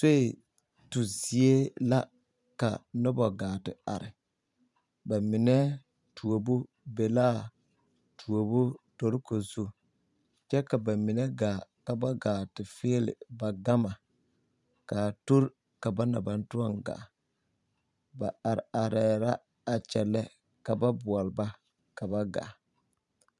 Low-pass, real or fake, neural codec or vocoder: 10.8 kHz; fake; vocoder, 44.1 kHz, 128 mel bands every 512 samples, BigVGAN v2